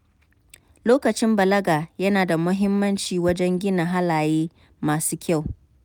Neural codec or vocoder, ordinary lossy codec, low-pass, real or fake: none; none; none; real